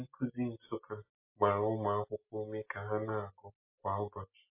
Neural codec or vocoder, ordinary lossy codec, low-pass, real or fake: none; MP3, 24 kbps; 3.6 kHz; real